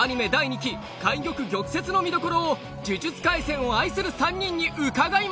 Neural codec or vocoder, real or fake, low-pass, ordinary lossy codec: none; real; none; none